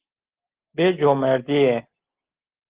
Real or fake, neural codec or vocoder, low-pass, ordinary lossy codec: real; none; 3.6 kHz; Opus, 16 kbps